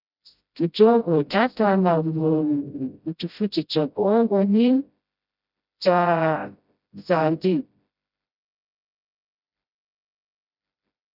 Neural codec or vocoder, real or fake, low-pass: codec, 16 kHz, 0.5 kbps, FreqCodec, smaller model; fake; 5.4 kHz